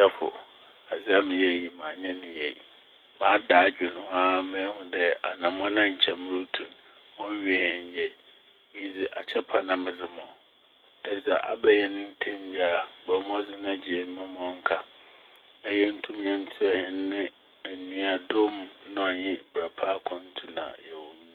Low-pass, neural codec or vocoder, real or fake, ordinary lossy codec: 19.8 kHz; codec, 44.1 kHz, 7.8 kbps, DAC; fake; none